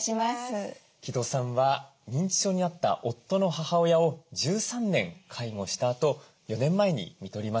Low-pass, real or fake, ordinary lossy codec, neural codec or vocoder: none; real; none; none